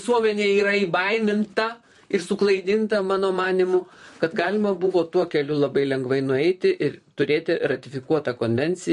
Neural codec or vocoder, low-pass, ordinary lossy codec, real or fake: vocoder, 44.1 kHz, 128 mel bands, Pupu-Vocoder; 14.4 kHz; MP3, 48 kbps; fake